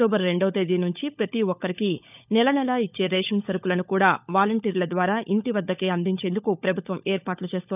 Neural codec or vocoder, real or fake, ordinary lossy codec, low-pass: codec, 16 kHz, 16 kbps, FunCodec, trained on LibriTTS, 50 frames a second; fake; none; 3.6 kHz